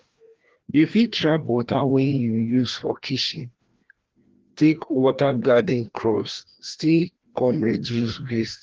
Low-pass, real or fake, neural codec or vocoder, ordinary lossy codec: 7.2 kHz; fake; codec, 16 kHz, 1 kbps, FreqCodec, larger model; Opus, 16 kbps